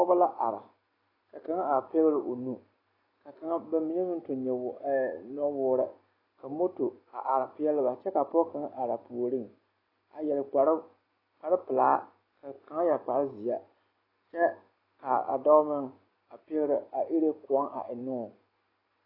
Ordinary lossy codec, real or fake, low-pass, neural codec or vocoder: AAC, 24 kbps; real; 5.4 kHz; none